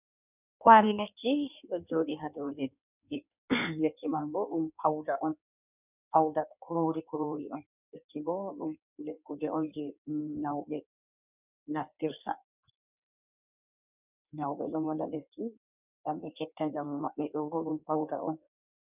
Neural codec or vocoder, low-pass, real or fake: codec, 16 kHz in and 24 kHz out, 1.1 kbps, FireRedTTS-2 codec; 3.6 kHz; fake